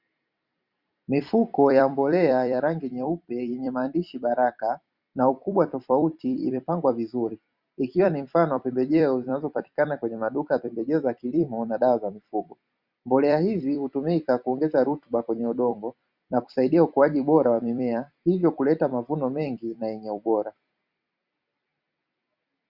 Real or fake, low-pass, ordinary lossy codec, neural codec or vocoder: fake; 5.4 kHz; Opus, 64 kbps; vocoder, 44.1 kHz, 128 mel bands every 256 samples, BigVGAN v2